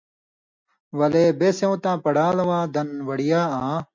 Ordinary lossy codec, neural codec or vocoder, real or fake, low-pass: MP3, 64 kbps; none; real; 7.2 kHz